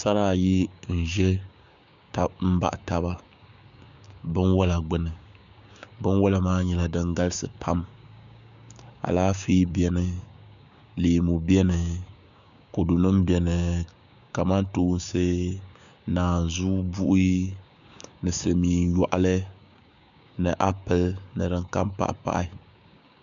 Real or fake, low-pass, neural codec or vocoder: fake; 7.2 kHz; codec, 16 kHz, 6 kbps, DAC